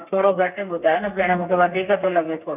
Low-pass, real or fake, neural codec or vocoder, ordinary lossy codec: 3.6 kHz; fake; codec, 32 kHz, 1.9 kbps, SNAC; none